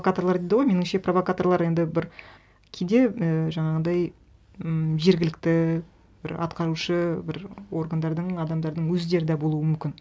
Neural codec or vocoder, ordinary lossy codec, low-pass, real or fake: none; none; none; real